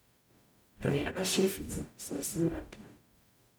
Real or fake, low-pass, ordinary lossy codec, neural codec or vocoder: fake; none; none; codec, 44.1 kHz, 0.9 kbps, DAC